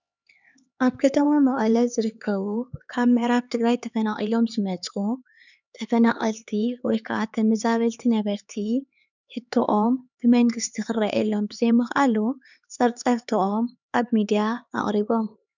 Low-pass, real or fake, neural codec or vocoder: 7.2 kHz; fake; codec, 16 kHz, 4 kbps, X-Codec, HuBERT features, trained on LibriSpeech